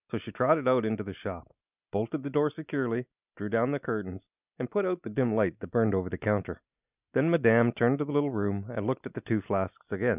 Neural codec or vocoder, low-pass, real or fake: none; 3.6 kHz; real